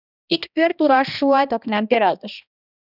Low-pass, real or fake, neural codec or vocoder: 5.4 kHz; fake; codec, 16 kHz, 1 kbps, X-Codec, HuBERT features, trained on balanced general audio